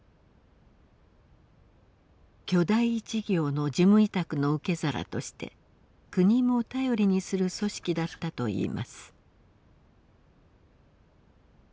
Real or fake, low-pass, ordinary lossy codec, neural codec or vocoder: real; none; none; none